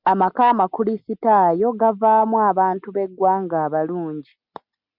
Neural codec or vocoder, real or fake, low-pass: none; real; 5.4 kHz